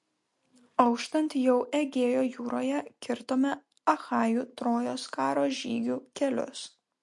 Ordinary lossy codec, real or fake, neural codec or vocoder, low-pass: MP3, 48 kbps; real; none; 10.8 kHz